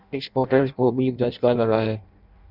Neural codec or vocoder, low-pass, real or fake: codec, 16 kHz in and 24 kHz out, 0.6 kbps, FireRedTTS-2 codec; 5.4 kHz; fake